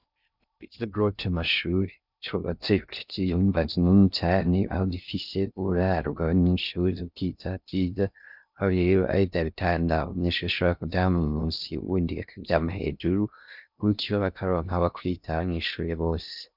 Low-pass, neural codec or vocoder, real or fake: 5.4 kHz; codec, 16 kHz in and 24 kHz out, 0.6 kbps, FocalCodec, streaming, 2048 codes; fake